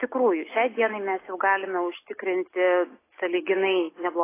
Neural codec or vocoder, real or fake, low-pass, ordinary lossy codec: none; real; 3.6 kHz; AAC, 16 kbps